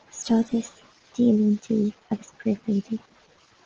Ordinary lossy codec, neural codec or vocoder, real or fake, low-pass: Opus, 16 kbps; none; real; 7.2 kHz